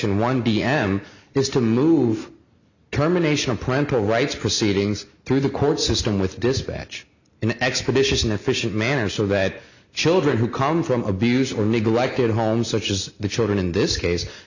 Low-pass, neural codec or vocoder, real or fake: 7.2 kHz; none; real